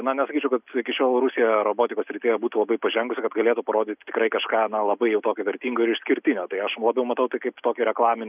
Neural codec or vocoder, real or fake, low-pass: none; real; 3.6 kHz